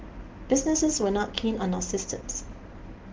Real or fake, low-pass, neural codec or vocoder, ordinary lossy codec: real; 7.2 kHz; none; Opus, 16 kbps